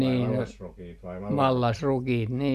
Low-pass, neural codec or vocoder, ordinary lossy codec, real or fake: 14.4 kHz; none; none; real